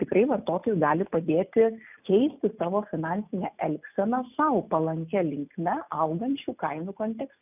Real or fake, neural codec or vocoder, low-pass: real; none; 3.6 kHz